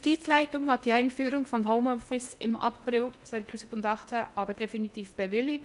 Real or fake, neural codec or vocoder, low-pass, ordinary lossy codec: fake; codec, 16 kHz in and 24 kHz out, 0.8 kbps, FocalCodec, streaming, 65536 codes; 10.8 kHz; AAC, 96 kbps